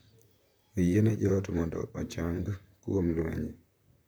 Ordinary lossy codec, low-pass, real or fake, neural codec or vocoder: none; none; fake; vocoder, 44.1 kHz, 128 mel bands, Pupu-Vocoder